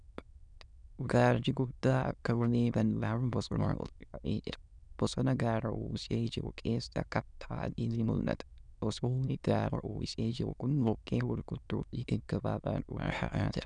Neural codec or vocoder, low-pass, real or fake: autoencoder, 22.05 kHz, a latent of 192 numbers a frame, VITS, trained on many speakers; 9.9 kHz; fake